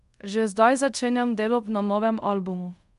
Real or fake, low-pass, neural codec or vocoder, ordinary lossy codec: fake; 10.8 kHz; codec, 24 kHz, 0.5 kbps, DualCodec; MP3, 64 kbps